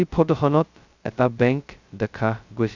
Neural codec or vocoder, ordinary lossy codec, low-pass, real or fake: codec, 16 kHz, 0.2 kbps, FocalCodec; Opus, 64 kbps; 7.2 kHz; fake